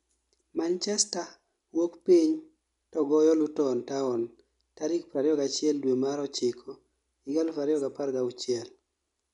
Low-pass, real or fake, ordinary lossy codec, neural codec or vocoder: 10.8 kHz; real; MP3, 96 kbps; none